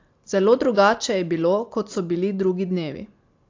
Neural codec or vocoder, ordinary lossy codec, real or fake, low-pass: none; AAC, 48 kbps; real; 7.2 kHz